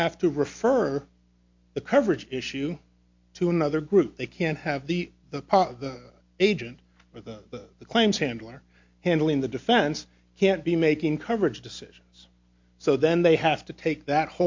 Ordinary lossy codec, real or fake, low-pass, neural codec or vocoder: MP3, 48 kbps; real; 7.2 kHz; none